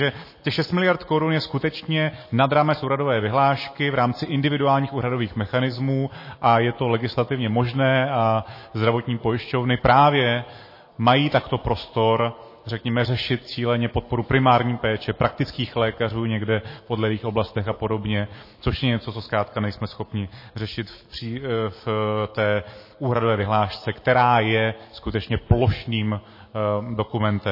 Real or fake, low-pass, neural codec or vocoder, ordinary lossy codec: real; 5.4 kHz; none; MP3, 24 kbps